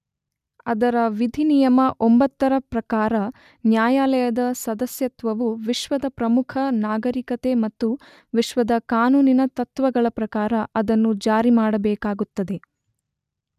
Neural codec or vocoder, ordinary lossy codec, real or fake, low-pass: none; none; real; 14.4 kHz